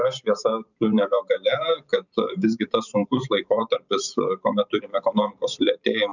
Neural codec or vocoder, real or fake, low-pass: vocoder, 44.1 kHz, 128 mel bands every 512 samples, BigVGAN v2; fake; 7.2 kHz